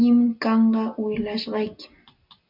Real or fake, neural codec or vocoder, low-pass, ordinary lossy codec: real; none; 5.4 kHz; MP3, 48 kbps